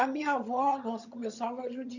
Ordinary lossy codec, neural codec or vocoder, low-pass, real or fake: none; vocoder, 22.05 kHz, 80 mel bands, HiFi-GAN; 7.2 kHz; fake